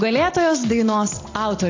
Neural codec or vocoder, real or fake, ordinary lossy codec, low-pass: none; real; AAC, 32 kbps; 7.2 kHz